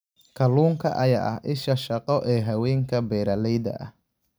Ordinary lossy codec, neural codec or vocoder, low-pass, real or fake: none; none; none; real